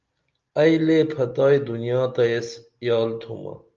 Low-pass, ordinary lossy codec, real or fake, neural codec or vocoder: 7.2 kHz; Opus, 24 kbps; real; none